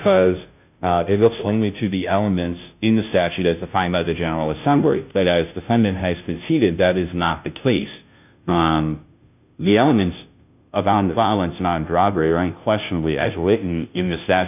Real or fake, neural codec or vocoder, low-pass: fake; codec, 16 kHz, 0.5 kbps, FunCodec, trained on Chinese and English, 25 frames a second; 3.6 kHz